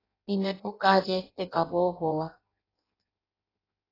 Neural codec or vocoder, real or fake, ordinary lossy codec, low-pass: codec, 16 kHz in and 24 kHz out, 0.6 kbps, FireRedTTS-2 codec; fake; AAC, 24 kbps; 5.4 kHz